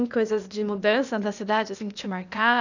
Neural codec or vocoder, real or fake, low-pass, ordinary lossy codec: codec, 16 kHz, 0.8 kbps, ZipCodec; fake; 7.2 kHz; none